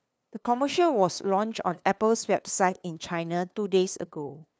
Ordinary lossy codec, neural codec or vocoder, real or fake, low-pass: none; codec, 16 kHz, 2 kbps, FunCodec, trained on LibriTTS, 25 frames a second; fake; none